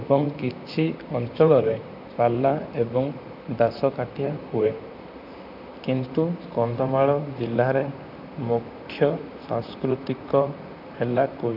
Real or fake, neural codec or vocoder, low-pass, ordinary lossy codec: fake; vocoder, 44.1 kHz, 128 mel bands, Pupu-Vocoder; 5.4 kHz; none